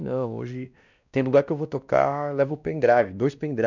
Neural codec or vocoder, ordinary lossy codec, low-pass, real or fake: codec, 16 kHz, 1 kbps, X-Codec, WavLM features, trained on Multilingual LibriSpeech; none; 7.2 kHz; fake